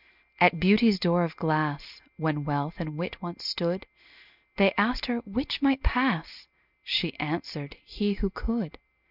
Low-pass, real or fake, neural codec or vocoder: 5.4 kHz; real; none